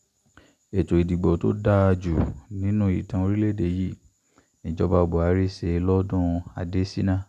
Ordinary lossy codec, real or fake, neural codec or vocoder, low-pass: none; real; none; 14.4 kHz